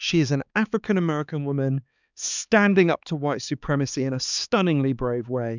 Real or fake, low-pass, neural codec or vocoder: fake; 7.2 kHz; codec, 16 kHz, 4 kbps, X-Codec, HuBERT features, trained on balanced general audio